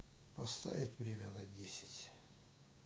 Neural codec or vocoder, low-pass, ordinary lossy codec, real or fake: codec, 16 kHz, 6 kbps, DAC; none; none; fake